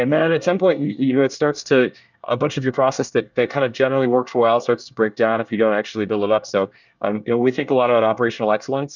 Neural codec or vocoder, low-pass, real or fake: codec, 24 kHz, 1 kbps, SNAC; 7.2 kHz; fake